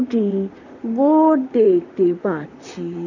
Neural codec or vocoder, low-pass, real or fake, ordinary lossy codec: vocoder, 44.1 kHz, 128 mel bands, Pupu-Vocoder; 7.2 kHz; fake; none